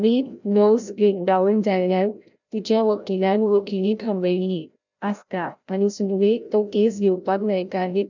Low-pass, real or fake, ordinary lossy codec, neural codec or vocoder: 7.2 kHz; fake; none; codec, 16 kHz, 0.5 kbps, FreqCodec, larger model